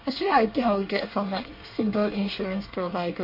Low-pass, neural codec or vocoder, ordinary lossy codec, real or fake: 5.4 kHz; codec, 24 kHz, 1 kbps, SNAC; MP3, 32 kbps; fake